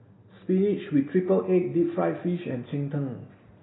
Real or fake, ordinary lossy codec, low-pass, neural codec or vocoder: real; AAC, 16 kbps; 7.2 kHz; none